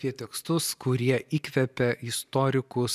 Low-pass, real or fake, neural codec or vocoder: 14.4 kHz; fake; vocoder, 44.1 kHz, 128 mel bands every 512 samples, BigVGAN v2